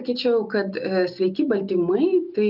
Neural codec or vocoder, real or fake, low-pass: none; real; 5.4 kHz